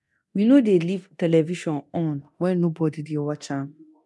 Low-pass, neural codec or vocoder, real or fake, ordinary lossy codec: none; codec, 24 kHz, 0.9 kbps, DualCodec; fake; none